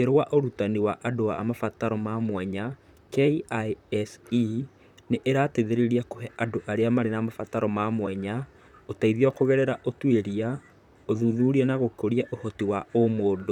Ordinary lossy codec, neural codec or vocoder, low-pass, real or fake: none; vocoder, 44.1 kHz, 128 mel bands, Pupu-Vocoder; 19.8 kHz; fake